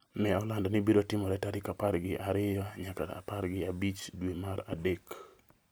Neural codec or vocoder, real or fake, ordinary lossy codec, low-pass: none; real; none; none